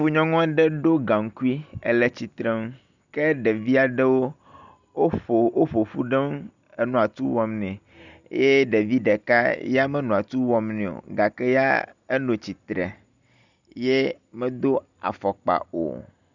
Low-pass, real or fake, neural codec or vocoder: 7.2 kHz; real; none